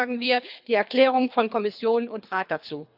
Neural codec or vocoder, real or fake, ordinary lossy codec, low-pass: codec, 24 kHz, 3 kbps, HILCodec; fake; none; 5.4 kHz